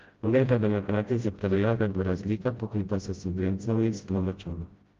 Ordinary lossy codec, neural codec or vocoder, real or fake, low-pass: Opus, 32 kbps; codec, 16 kHz, 0.5 kbps, FreqCodec, smaller model; fake; 7.2 kHz